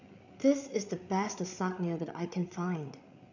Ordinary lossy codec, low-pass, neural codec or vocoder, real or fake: none; 7.2 kHz; codec, 16 kHz, 8 kbps, FreqCodec, larger model; fake